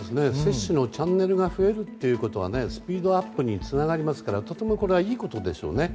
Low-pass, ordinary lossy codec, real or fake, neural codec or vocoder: none; none; real; none